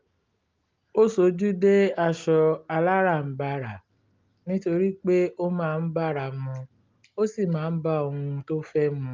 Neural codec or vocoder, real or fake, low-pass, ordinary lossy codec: none; real; 7.2 kHz; Opus, 24 kbps